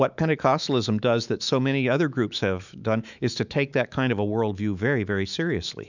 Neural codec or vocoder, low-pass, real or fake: autoencoder, 48 kHz, 128 numbers a frame, DAC-VAE, trained on Japanese speech; 7.2 kHz; fake